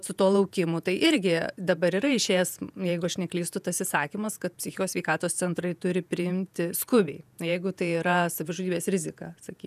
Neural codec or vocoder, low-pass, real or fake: vocoder, 48 kHz, 128 mel bands, Vocos; 14.4 kHz; fake